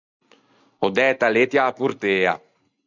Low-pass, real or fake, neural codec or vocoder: 7.2 kHz; real; none